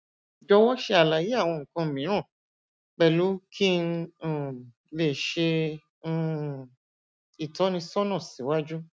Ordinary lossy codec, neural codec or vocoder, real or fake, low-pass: none; none; real; none